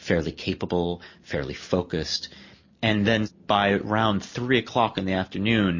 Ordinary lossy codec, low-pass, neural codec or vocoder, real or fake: MP3, 32 kbps; 7.2 kHz; none; real